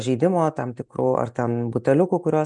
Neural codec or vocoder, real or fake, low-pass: none; real; 10.8 kHz